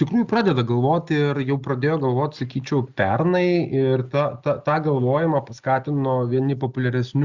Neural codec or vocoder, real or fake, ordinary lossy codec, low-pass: none; real; Opus, 64 kbps; 7.2 kHz